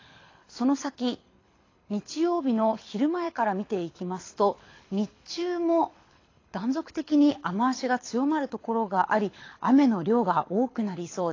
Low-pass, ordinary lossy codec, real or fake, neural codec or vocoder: 7.2 kHz; AAC, 32 kbps; fake; codec, 24 kHz, 6 kbps, HILCodec